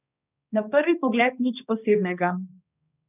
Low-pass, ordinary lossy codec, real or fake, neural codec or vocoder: 3.6 kHz; none; fake; codec, 16 kHz, 2 kbps, X-Codec, HuBERT features, trained on balanced general audio